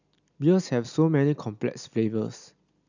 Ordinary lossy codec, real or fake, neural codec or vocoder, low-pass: none; real; none; 7.2 kHz